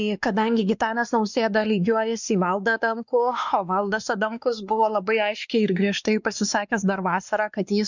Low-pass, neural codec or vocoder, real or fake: 7.2 kHz; codec, 16 kHz, 2 kbps, X-Codec, WavLM features, trained on Multilingual LibriSpeech; fake